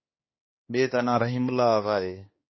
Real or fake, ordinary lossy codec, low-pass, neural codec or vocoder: fake; MP3, 24 kbps; 7.2 kHz; codec, 16 kHz, 2 kbps, X-Codec, HuBERT features, trained on balanced general audio